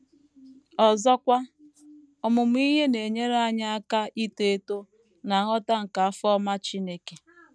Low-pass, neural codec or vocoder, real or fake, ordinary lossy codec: none; none; real; none